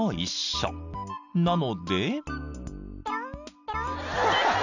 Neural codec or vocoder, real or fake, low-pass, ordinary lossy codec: none; real; 7.2 kHz; none